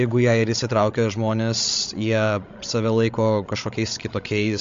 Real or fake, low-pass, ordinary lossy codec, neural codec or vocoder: fake; 7.2 kHz; MP3, 48 kbps; codec, 16 kHz, 16 kbps, FreqCodec, larger model